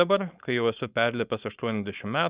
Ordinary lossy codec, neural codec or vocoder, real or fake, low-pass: Opus, 64 kbps; codec, 16 kHz, 4.8 kbps, FACodec; fake; 3.6 kHz